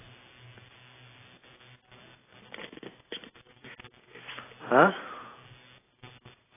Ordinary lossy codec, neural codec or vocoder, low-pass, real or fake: AAC, 16 kbps; none; 3.6 kHz; real